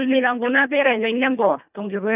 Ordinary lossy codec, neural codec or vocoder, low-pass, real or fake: none; codec, 24 kHz, 1.5 kbps, HILCodec; 3.6 kHz; fake